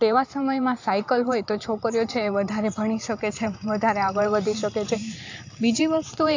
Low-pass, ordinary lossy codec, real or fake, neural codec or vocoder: 7.2 kHz; AAC, 48 kbps; fake; vocoder, 44.1 kHz, 128 mel bands every 512 samples, BigVGAN v2